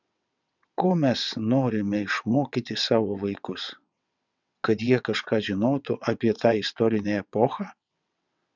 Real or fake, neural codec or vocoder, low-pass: fake; vocoder, 22.05 kHz, 80 mel bands, WaveNeXt; 7.2 kHz